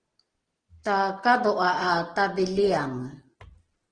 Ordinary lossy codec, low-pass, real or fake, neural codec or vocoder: Opus, 16 kbps; 9.9 kHz; real; none